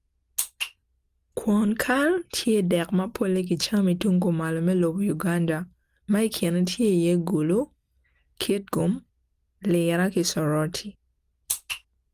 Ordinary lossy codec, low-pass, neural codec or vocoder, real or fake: Opus, 32 kbps; 14.4 kHz; none; real